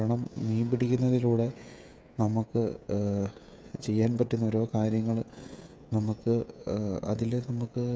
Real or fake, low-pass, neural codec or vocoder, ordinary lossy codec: fake; none; codec, 16 kHz, 16 kbps, FreqCodec, smaller model; none